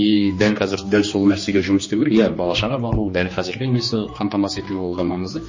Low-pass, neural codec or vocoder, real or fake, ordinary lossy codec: 7.2 kHz; codec, 16 kHz, 2 kbps, X-Codec, HuBERT features, trained on general audio; fake; MP3, 32 kbps